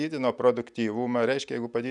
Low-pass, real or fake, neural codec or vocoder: 10.8 kHz; real; none